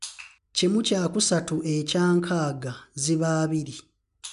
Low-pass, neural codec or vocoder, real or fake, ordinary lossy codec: 10.8 kHz; none; real; MP3, 96 kbps